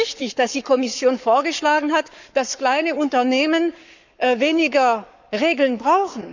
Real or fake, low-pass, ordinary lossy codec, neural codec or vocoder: fake; 7.2 kHz; none; codec, 44.1 kHz, 7.8 kbps, Pupu-Codec